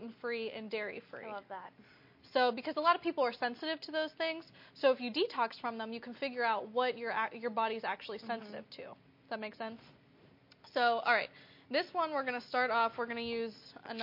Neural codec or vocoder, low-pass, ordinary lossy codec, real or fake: none; 5.4 kHz; MP3, 32 kbps; real